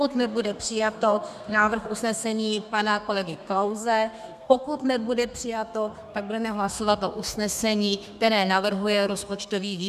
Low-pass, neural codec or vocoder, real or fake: 14.4 kHz; codec, 32 kHz, 1.9 kbps, SNAC; fake